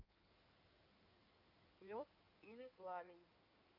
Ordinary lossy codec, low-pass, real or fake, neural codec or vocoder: none; 5.4 kHz; fake; codec, 16 kHz in and 24 kHz out, 2.2 kbps, FireRedTTS-2 codec